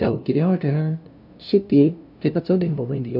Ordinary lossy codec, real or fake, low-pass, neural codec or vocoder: none; fake; 5.4 kHz; codec, 16 kHz, 0.5 kbps, FunCodec, trained on LibriTTS, 25 frames a second